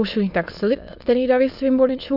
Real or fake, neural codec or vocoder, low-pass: fake; autoencoder, 22.05 kHz, a latent of 192 numbers a frame, VITS, trained on many speakers; 5.4 kHz